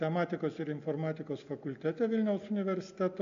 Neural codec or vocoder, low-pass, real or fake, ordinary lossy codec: none; 7.2 kHz; real; AAC, 64 kbps